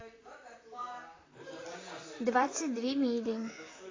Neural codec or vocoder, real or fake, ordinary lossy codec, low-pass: vocoder, 44.1 kHz, 128 mel bands, Pupu-Vocoder; fake; AAC, 32 kbps; 7.2 kHz